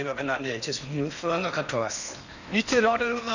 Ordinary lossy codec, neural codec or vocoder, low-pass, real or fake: none; codec, 16 kHz in and 24 kHz out, 0.8 kbps, FocalCodec, streaming, 65536 codes; 7.2 kHz; fake